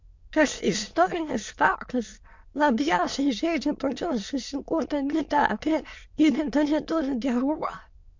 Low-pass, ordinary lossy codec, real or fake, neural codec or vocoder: 7.2 kHz; MP3, 48 kbps; fake; autoencoder, 22.05 kHz, a latent of 192 numbers a frame, VITS, trained on many speakers